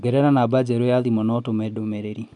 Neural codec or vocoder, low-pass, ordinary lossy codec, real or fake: none; 10.8 kHz; none; real